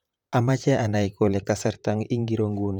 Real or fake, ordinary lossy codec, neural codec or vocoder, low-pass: fake; none; vocoder, 44.1 kHz, 128 mel bands, Pupu-Vocoder; 19.8 kHz